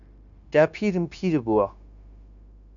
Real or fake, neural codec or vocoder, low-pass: fake; codec, 16 kHz, 0.9 kbps, LongCat-Audio-Codec; 7.2 kHz